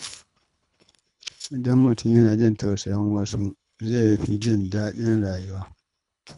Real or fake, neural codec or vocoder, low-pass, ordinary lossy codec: fake; codec, 24 kHz, 3 kbps, HILCodec; 10.8 kHz; none